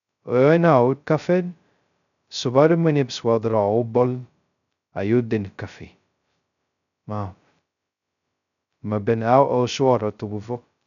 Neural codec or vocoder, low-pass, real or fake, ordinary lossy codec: codec, 16 kHz, 0.2 kbps, FocalCodec; 7.2 kHz; fake; none